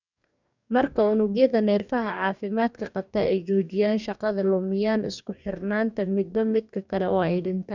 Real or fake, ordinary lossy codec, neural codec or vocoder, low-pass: fake; none; codec, 44.1 kHz, 2.6 kbps, DAC; 7.2 kHz